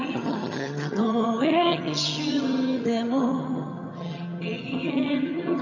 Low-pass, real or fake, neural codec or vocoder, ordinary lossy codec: 7.2 kHz; fake; vocoder, 22.05 kHz, 80 mel bands, HiFi-GAN; none